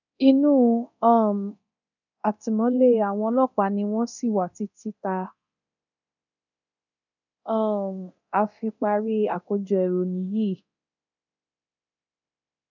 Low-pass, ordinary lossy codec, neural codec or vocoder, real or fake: 7.2 kHz; none; codec, 24 kHz, 0.9 kbps, DualCodec; fake